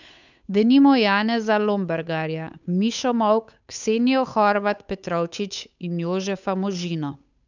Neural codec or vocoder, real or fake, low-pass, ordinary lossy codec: codec, 16 kHz, 8 kbps, FunCodec, trained on Chinese and English, 25 frames a second; fake; 7.2 kHz; none